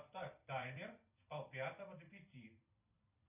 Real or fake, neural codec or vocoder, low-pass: fake; vocoder, 44.1 kHz, 128 mel bands every 256 samples, BigVGAN v2; 3.6 kHz